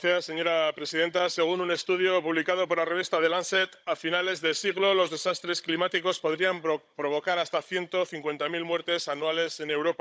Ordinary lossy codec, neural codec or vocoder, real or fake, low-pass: none; codec, 16 kHz, 16 kbps, FunCodec, trained on Chinese and English, 50 frames a second; fake; none